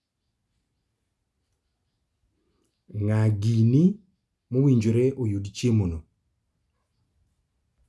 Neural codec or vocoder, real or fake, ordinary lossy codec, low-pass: none; real; none; none